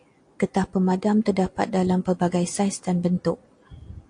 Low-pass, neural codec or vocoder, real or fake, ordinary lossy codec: 9.9 kHz; none; real; AAC, 48 kbps